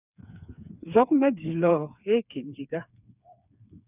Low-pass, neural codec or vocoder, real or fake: 3.6 kHz; codec, 16 kHz, 4 kbps, FreqCodec, smaller model; fake